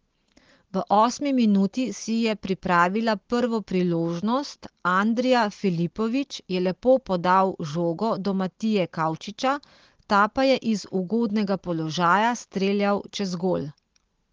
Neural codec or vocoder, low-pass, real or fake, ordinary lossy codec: none; 7.2 kHz; real; Opus, 16 kbps